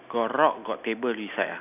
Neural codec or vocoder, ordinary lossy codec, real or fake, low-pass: none; none; real; 3.6 kHz